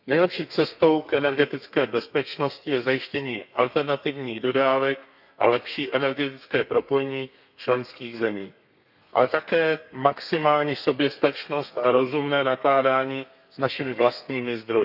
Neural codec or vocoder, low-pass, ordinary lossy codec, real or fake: codec, 32 kHz, 1.9 kbps, SNAC; 5.4 kHz; none; fake